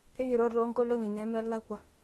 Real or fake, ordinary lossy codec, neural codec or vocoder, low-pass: fake; AAC, 32 kbps; autoencoder, 48 kHz, 32 numbers a frame, DAC-VAE, trained on Japanese speech; 19.8 kHz